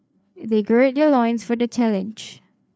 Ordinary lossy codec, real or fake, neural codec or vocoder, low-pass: none; fake; codec, 16 kHz, 4 kbps, FreqCodec, larger model; none